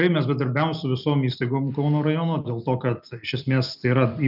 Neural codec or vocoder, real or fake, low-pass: none; real; 5.4 kHz